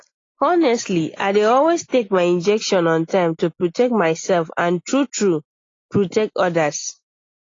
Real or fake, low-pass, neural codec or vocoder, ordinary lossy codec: real; 7.2 kHz; none; AAC, 32 kbps